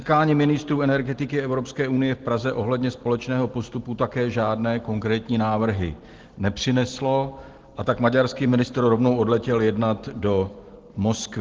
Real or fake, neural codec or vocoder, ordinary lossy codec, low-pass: real; none; Opus, 16 kbps; 7.2 kHz